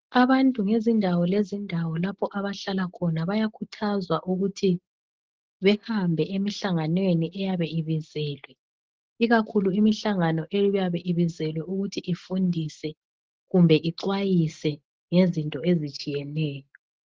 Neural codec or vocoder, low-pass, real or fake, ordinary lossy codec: none; 7.2 kHz; real; Opus, 16 kbps